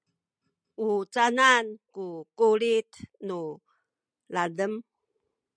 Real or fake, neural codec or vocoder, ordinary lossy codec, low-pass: real; none; MP3, 96 kbps; 9.9 kHz